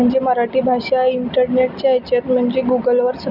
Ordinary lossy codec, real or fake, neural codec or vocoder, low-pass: none; real; none; 5.4 kHz